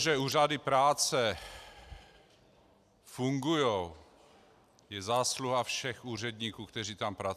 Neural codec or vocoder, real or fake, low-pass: none; real; 14.4 kHz